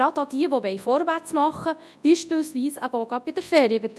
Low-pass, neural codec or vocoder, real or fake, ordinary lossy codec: none; codec, 24 kHz, 0.9 kbps, WavTokenizer, large speech release; fake; none